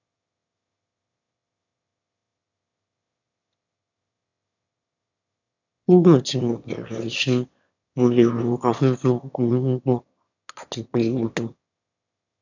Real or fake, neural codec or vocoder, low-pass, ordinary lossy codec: fake; autoencoder, 22.05 kHz, a latent of 192 numbers a frame, VITS, trained on one speaker; 7.2 kHz; none